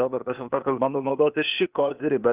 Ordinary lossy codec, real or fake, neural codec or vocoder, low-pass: Opus, 32 kbps; fake; codec, 16 kHz, 0.8 kbps, ZipCodec; 3.6 kHz